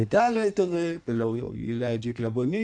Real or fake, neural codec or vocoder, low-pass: fake; codec, 16 kHz in and 24 kHz out, 1.1 kbps, FireRedTTS-2 codec; 9.9 kHz